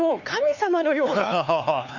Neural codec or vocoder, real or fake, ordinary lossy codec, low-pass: codec, 16 kHz, 4 kbps, X-Codec, HuBERT features, trained on LibriSpeech; fake; none; 7.2 kHz